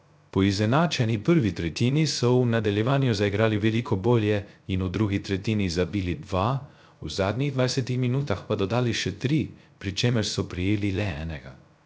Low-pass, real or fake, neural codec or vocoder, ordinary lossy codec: none; fake; codec, 16 kHz, 0.3 kbps, FocalCodec; none